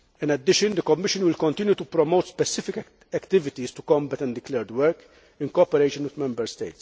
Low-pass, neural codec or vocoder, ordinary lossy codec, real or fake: none; none; none; real